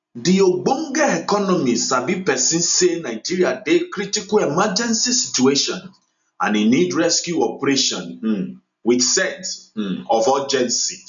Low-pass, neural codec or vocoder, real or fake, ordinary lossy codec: 7.2 kHz; none; real; none